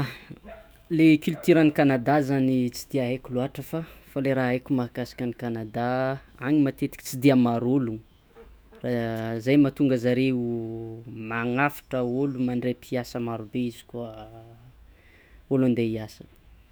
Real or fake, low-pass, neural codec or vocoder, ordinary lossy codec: fake; none; autoencoder, 48 kHz, 128 numbers a frame, DAC-VAE, trained on Japanese speech; none